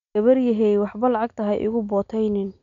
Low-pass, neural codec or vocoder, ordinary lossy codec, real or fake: 7.2 kHz; none; none; real